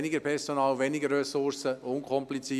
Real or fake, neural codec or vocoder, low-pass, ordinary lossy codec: real; none; 14.4 kHz; none